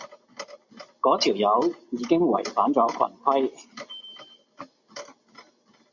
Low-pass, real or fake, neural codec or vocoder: 7.2 kHz; real; none